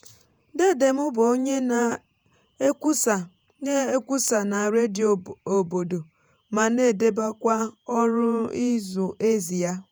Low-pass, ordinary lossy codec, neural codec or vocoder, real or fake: none; none; vocoder, 48 kHz, 128 mel bands, Vocos; fake